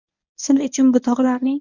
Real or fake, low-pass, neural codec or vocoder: fake; 7.2 kHz; codec, 24 kHz, 0.9 kbps, WavTokenizer, medium speech release version 1